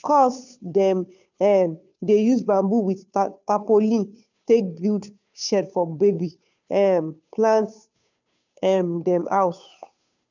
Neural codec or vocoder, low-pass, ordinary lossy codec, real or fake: codec, 16 kHz, 2 kbps, FunCodec, trained on Chinese and English, 25 frames a second; 7.2 kHz; none; fake